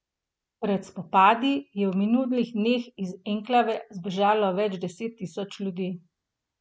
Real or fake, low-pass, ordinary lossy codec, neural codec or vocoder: real; none; none; none